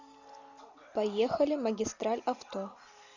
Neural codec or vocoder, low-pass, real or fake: none; 7.2 kHz; real